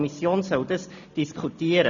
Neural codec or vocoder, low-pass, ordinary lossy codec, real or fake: none; 7.2 kHz; none; real